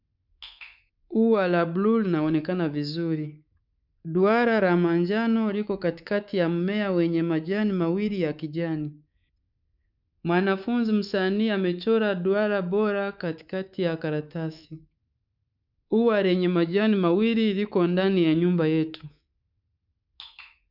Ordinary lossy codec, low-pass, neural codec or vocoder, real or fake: none; 5.4 kHz; codec, 24 kHz, 3.1 kbps, DualCodec; fake